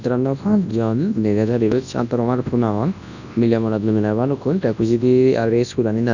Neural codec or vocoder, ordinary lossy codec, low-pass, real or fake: codec, 24 kHz, 0.9 kbps, WavTokenizer, large speech release; none; 7.2 kHz; fake